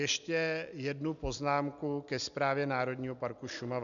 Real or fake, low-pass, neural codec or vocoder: real; 7.2 kHz; none